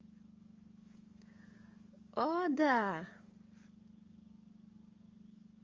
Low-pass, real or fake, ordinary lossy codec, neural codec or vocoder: 7.2 kHz; fake; Opus, 32 kbps; codec, 44.1 kHz, 7.8 kbps, DAC